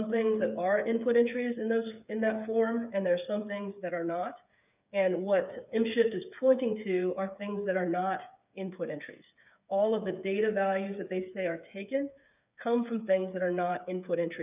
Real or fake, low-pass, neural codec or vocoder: fake; 3.6 kHz; codec, 16 kHz, 8 kbps, FreqCodec, smaller model